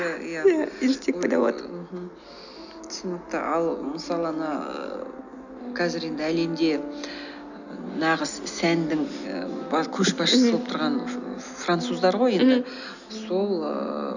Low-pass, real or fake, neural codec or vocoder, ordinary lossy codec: 7.2 kHz; real; none; none